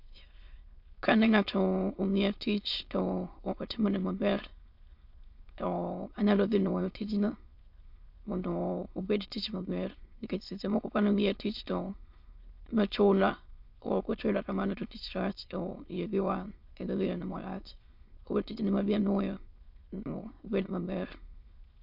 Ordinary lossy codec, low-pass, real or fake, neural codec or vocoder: MP3, 48 kbps; 5.4 kHz; fake; autoencoder, 22.05 kHz, a latent of 192 numbers a frame, VITS, trained on many speakers